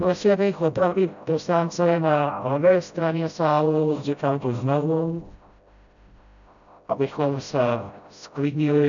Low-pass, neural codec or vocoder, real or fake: 7.2 kHz; codec, 16 kHz, 0.5 kbps, FreqCodec, smaller model; fake